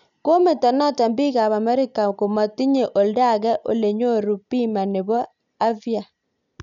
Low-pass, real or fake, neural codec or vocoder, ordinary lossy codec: 7.2 kHz; real; none; none